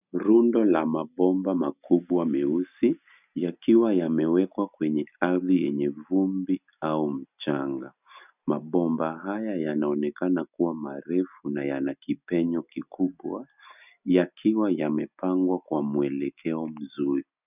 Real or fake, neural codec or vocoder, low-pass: real; none; 3.6 kHz